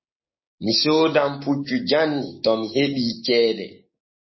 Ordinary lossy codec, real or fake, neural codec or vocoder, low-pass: MP3, 24 kbps; fake; codec, 16 kHz, 6 kbps, DAC; 7.2 kHz